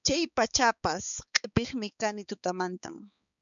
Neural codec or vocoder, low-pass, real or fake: codec, 16 kHz, 4 kbps, X-Codec, HuBERT features, trained on balanced general audio; 7.2 kHz; fake